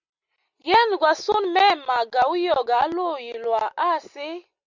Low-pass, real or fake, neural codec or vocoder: 7.2 kHz; real; none